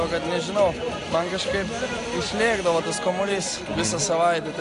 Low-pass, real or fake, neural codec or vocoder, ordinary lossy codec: 10.8 kHz; real; none; AAC, 48 kbps